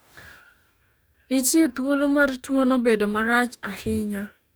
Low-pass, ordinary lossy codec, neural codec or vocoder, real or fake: none; none; codec, 44.1 kHz, 2.6 kbps, DAC; fake